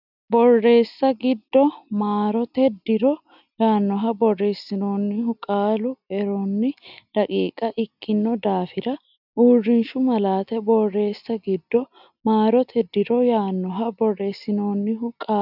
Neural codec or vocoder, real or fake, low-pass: none; real; 5.4 kHz